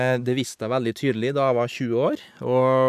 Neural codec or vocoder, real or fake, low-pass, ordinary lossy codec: none; real; 14.4 kHz; none